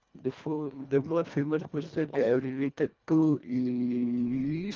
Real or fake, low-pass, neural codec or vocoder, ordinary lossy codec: fake; 7.2 kHz; codec, 24 kHz, 1.5 kbps, HILCodec; Opus, 24 kbps